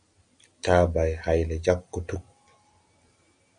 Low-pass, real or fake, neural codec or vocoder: 9.9 kHz; real; none